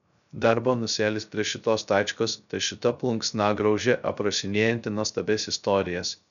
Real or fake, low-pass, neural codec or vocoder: fake; 7.2 kHz; codec, 16 kHz, 0.3 kbps, FocalCodec